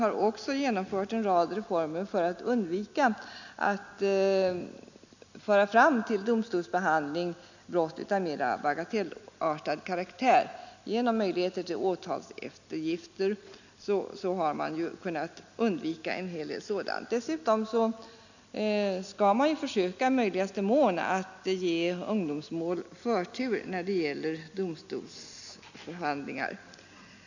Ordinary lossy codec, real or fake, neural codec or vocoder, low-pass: none; real; none; 7.2 kHz